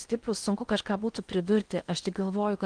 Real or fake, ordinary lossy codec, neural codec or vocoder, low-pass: fake; Opus, 24 kbps; codec, 16 kHz in and 24 kHz out, 0.8 kbps, FocalCodec, streaming, 65536 codes; 9.9 kHz